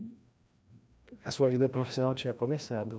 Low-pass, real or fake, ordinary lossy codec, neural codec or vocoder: none; fake; none; codec, 16 kHz, 1 kbps, FreqCodec, larger model